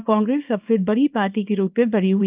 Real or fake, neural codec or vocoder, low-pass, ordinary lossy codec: fake; codec, 24 kHz, 0.9 kbps, WavTokenizer, small release; 3.6 kHz; Opus, 24 kbps